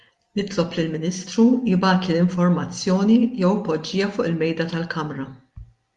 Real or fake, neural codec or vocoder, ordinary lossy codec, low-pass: real; none; Opus, 32 kbps; 10.8 kHz